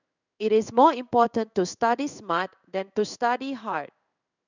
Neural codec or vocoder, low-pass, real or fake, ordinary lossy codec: codec, 16 kHz in and 24 kHz out, 1 kbps, XY-Tokenizer; 7.2 kHz; fake; none